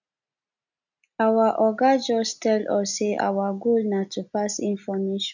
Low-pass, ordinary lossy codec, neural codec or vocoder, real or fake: 7.2 kHz; none; none; real